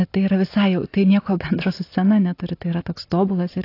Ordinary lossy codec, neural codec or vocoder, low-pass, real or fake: AAC, 32 kbps; none; 5.4 kHz; real